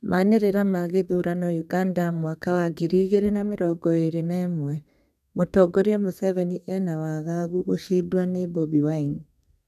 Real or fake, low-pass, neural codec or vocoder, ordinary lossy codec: fake; 14.4 kHz; codec, 32 kHz, 1.9 kbps, SNAC; none